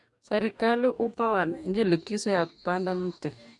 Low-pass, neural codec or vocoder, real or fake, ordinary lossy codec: 10.8 kHz; codec, 44.1 kHz, 2.6 kbps, DAC; fake; MP3, 96 kbps